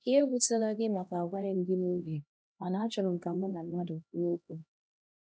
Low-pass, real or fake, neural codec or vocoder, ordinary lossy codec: none; fake; codec, 16 kHz, 1 kbps, X-Codec, HuBERT features, trained on LibriSpeech; none